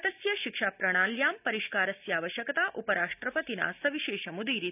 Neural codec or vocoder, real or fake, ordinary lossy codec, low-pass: none; real; none; 3.6 kHz